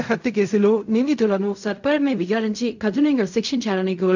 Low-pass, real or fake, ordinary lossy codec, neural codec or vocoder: 7.2 kHz; fake; none; codec, 16 kHz in and 24 kHz out, 0.4 kbps, LongCat-Audio-Codec, fine tuned four codebook decoder